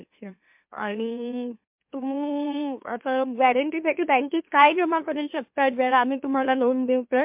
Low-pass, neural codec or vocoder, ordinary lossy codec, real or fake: 3.6 kHz; autoencoder, 44.1 kHz, a latent of 192 numbers a frame, MeloTTS; MP3, 32 kbps; fake